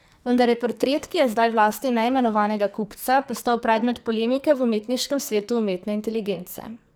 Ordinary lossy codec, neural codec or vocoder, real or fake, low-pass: none; codec, 44.1 kHz, 2.6 kbps, SNAC; fake; none